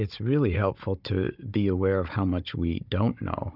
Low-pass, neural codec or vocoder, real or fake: 5.4 kHz; codec, 16 kHz, 16 kbps, FunCodec, trained on Chinese and English, 50 frames a second; fake